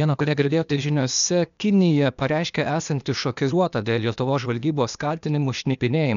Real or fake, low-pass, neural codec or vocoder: fake; 7.2 kHz; codec, 16 kHz, 0.8 kbps, ZipCodec